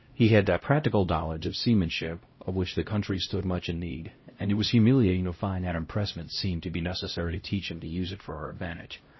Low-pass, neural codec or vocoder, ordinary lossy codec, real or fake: 7.2 kHz; codec, 16 kHz, 0.5 kbps, X-Codec, HuBERT features, trained on LibriSpeech; MP3, 24 kbps; fake